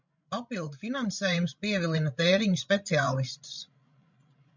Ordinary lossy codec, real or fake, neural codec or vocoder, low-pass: MP3, 64 kbps; fake; codec, 16 kHz, 16 kbps, FreqCodec, larger model; 7.2 kHz